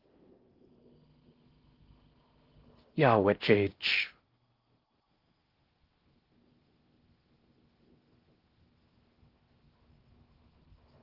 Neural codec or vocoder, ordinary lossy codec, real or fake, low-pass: codec, 16 kHz in and 24 kHz out, 0.6 kbps, FocalCodec, streaming, 4096 codes; Opus, 16 kbps; fake; 5.4 kHz